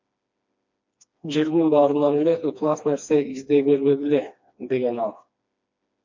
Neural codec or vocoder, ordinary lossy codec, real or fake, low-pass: codec, 16 kHz, 2 kbps, FreqCodec, smaller model; MP3, 48 kbps; fake; 7.2 kHz